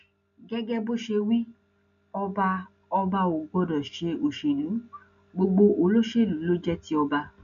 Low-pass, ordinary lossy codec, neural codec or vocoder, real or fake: 7.2 kHz; none; none; real